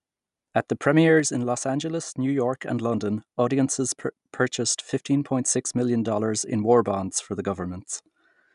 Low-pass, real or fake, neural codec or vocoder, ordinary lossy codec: 10.8 kHz; real; none; none